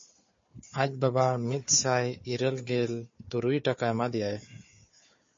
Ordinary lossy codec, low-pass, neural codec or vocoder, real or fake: MP3, 32 kbps; 7.2 kHz; codec, 16 kHz, 4 kbps, FunCodec, trained on Chinese and English, 50 frames a second; fake